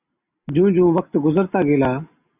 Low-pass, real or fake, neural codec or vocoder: 3.6 kHz; real; none